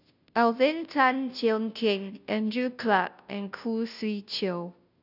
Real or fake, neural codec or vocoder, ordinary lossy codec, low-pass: fake; codec, 16 kHz, 0.5 kbps, FunCodec, trained on Chinese and English, 25 frames a second; none; 5.4 kHz